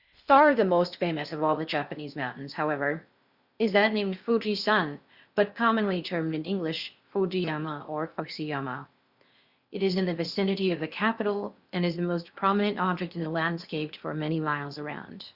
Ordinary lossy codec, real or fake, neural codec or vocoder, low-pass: Opus, 64 kbps; fake; codec, 16 kHz in and 24 kHz out, 0.6 kbps, FocalCodec, streaming, 4096 codes; 5.4 kHz